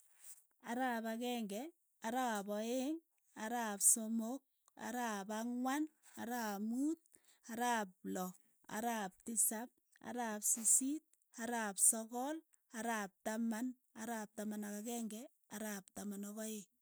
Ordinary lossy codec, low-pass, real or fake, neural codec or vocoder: none; none; real; none